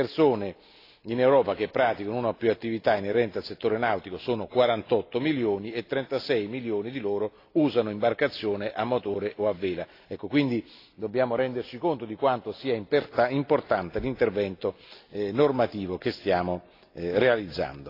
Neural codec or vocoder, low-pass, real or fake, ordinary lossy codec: none; 5.4 kHz; real; AAC, 32 kbps